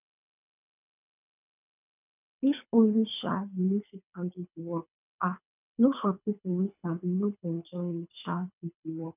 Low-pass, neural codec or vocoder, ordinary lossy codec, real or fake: 3.6 kHz; codec, 24 kHz, 3 kbps, HILCodec; none; fake